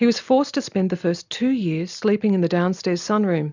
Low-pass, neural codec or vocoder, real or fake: 7.2 kHz; none; real